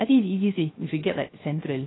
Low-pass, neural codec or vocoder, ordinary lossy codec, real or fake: 7.2 kHz; codec, 16 kHz, 0.3 kbps, FocalCodec; AAC, 16 kbps; fake